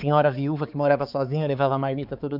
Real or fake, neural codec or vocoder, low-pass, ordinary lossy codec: fake; codec, 16 kHz, 4 kbps, X-Codec, HuBERT features, trained on balanced general audio; 5.4 kHz; none